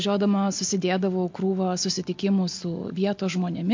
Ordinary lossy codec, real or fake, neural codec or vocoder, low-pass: MP3, 48 kbps; real; none; 7.2 kHz